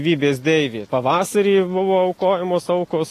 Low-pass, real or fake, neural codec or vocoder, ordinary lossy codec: 14.4 kHz; real; none; AAC, 48 kbps